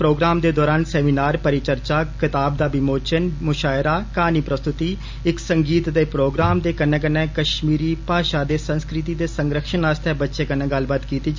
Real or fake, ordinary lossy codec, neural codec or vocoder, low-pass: real; MP3, 64 kbps; none; 7.2 kHz